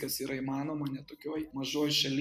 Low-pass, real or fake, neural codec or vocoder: 14.4 kHz; real; none